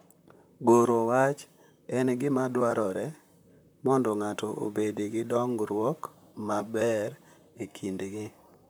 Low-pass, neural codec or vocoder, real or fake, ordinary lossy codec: none; vocoder, 44.1 kHz, 128 mel bands, Pupu-Vocoder; fake; none